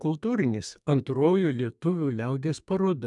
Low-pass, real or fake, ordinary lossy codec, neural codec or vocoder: 10.8 kHz; fake; MP3, 96 kbps; codec, 32 kHz, 1.9 kbps, SNAC